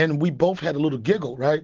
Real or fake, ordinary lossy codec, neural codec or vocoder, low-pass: real; Opus, 16 kbps; none; 7.2 kHz